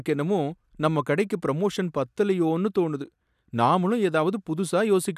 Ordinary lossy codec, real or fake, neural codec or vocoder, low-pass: none; real; none; 14.4 kHz